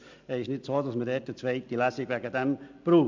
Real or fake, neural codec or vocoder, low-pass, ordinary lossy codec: real; none; 7.2 kHz; MP3, 64 kbps